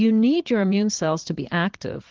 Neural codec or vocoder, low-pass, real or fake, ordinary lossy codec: vocoder, 22.05 kHz, 80 mel bands, Vocos; 7.2 kHz; fake; Opus, 16 kbps